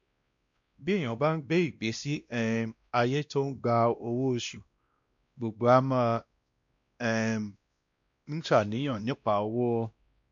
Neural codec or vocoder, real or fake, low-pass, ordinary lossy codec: codec, 16 kHz, 1 kbps, X-Codec, WavLM features, trained on Multilingual LibriSpeech; fake; 7.2 kHz; MP3, 64 kbps